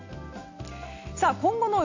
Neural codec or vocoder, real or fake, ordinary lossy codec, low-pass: none; real; AAC, 48 kbps; 7.2 kHz